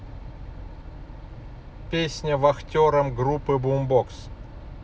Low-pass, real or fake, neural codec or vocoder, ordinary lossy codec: none; real; none; none